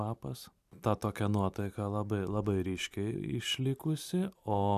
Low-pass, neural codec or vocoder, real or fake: 14.4 kHz; vocoder, 44.1 kHz, 128 mel bands every 512 samples, BigVGAN v2; fake